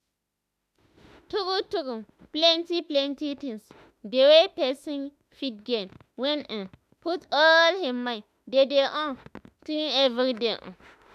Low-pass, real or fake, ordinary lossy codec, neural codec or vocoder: 14.4 kHz; fake; none; autoencoder, 48 kHz, 32 numbers a frame, DAC-VAE, trained on Japanese speech